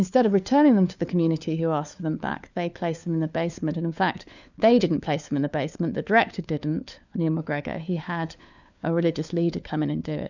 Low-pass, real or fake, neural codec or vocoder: 7.2 kHz; fake; codec, 16 kHz, 4 kbps, FunCodec, trained on LibriTTS, 50 frames a second